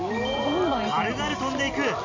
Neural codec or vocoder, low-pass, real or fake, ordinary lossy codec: none; 7.2 kHz; real; MP3, 48 kbps